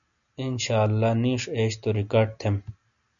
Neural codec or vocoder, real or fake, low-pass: none; real; 7.2 kHz